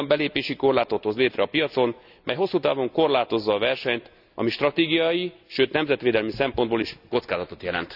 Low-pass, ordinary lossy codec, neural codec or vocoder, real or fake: 5.4 kHz; none; none; real